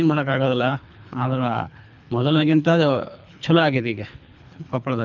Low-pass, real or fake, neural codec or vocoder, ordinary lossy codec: 7.2 kHz; fake; codec, 24 kHz, 3 kbps, HILCodec; none